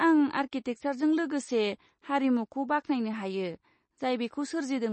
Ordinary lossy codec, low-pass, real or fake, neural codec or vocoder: MP3, 32 kbps; 10.8 kHz; real; none